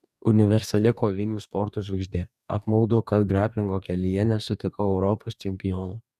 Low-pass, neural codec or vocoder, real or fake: 14.4 kHz; codec, 44.1 kHz, 2.6 kbps, DAC; fake